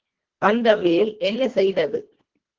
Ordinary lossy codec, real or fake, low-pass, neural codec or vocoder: Opus, 16 kbps; fake; 7.2 kHz; codec, 24 kHz, 1.5 kbps, HILCodec